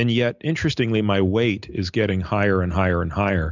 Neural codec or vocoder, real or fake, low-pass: none; real; 7.2 kHz